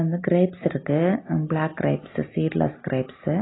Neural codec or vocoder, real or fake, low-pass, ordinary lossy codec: none; real; 7.2 kHz; AAC, 16 kbps